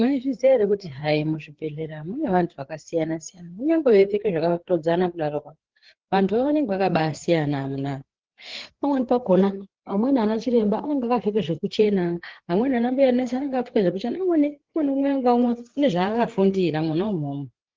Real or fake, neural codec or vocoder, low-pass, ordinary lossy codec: fake; codec, 16 kHz, 4 kbps, FreqCodec, larger model; 7.2 kHz; Opus, 16 kbps